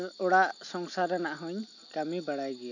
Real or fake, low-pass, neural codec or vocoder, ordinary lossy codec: real; 7.2 kHz; none; AAC, 48 kbps